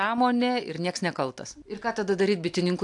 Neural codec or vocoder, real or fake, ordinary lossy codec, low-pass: none; real; AAC, 64 kbps; 10.8 kHz